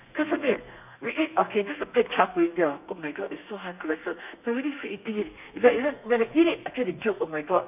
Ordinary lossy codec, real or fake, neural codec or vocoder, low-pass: none; fake; codec, 32 kHz, 1.9 kbps, SNAC; 3.6 kHz